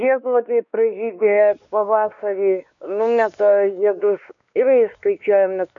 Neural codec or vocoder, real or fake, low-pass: codec, 16 kHz, 2 kbps, X-Codec, WavLM features, trained on Multilingual LibriSpeech; fake; 7.2 kHz